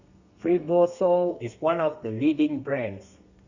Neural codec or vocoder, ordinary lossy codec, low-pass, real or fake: codec, 32 kHz, 1.9 kbps, SNAC; Opus, 64 kbps; 7.2 kHz; fake